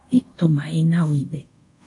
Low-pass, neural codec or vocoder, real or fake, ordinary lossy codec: 10.8 kHz; codec, 24 kHz, 0.5 kbps, DualCodec; fake; AAC, 64 kbps